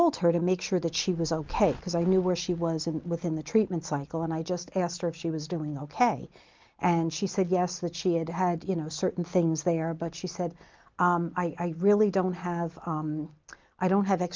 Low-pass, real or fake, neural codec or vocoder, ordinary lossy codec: 7.2 kHz; real; none; Opus, 24 kbps